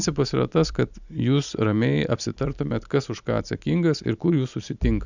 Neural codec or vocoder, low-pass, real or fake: none; 7.2 kHz; real